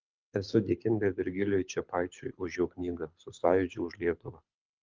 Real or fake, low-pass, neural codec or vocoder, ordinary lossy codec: fake; 7.2 kHz; codec, 24 kHz, 6 kbps, HILCodec; Opus, 32 kbps